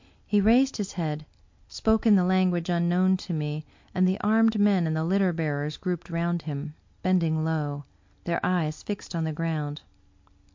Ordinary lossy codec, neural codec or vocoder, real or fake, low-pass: MP3, 48 kbps; none; real; 7.2 kHz